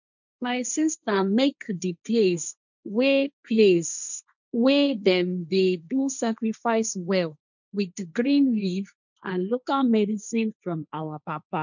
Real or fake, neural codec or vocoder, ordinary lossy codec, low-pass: fake; codec, 16 kHz, 1.1 kbps, Voila-Tokenizer; none; 7.2 kHz